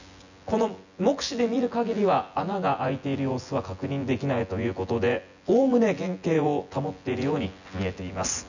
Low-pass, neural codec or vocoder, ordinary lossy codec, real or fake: 7.2 kHz; vocoder, 24 kHz, 100 mel bands, Vocos; none; fake